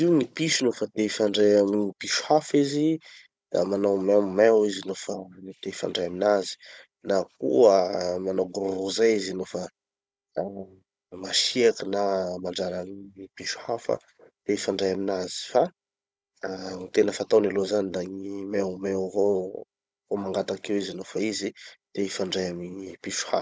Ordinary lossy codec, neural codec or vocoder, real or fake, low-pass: none; codec, 16 kHz, 16 kbps, FunCodec, trained on Chinese and English, 50 frames a second; fake; none